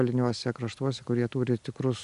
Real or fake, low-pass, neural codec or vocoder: real; 10.8 kHz; none